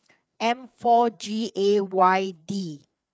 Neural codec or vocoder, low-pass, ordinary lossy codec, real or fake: codec, 16 kHz, 4 kbps, FreqCodec, larger model; none; none; fake